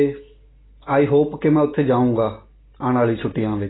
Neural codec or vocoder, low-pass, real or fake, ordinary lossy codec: none; 7.2 kHz; real; AAC, 16 kbps